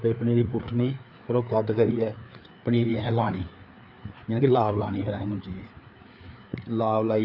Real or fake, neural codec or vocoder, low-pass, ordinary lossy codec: fake; codec, 16 kHz, 4 kbps, FreqCodec, larger model; 5.4 kHz; none